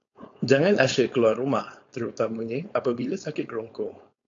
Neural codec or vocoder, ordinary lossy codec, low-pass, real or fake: codec, 16 kHz, 4.8 kbps, FACodec; AAC, 48 kbps; 7.2 kHz; fake